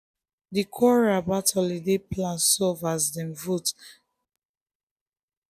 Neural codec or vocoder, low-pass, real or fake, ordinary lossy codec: none; 14.4 kHz; real; none